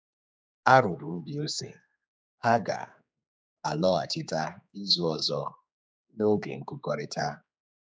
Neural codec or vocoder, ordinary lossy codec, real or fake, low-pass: codec, 16 kHz, 4 kbps, X-Codec, HuBERT features, trained on general audio; none; fake; none